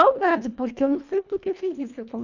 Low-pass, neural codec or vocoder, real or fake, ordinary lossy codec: 7.2 kHz; codec, 24 kHz, 1.5 kbps, HILCodec; fake; none